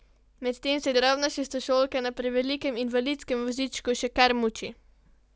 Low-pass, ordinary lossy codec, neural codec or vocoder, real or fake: none; none; none; real